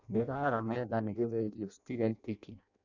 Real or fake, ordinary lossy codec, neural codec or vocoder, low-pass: fake; none; codec, 16 kHz in and 24 kHz out, 0.6 kbps, FireRedTTS-2 codec; 7.2 kHz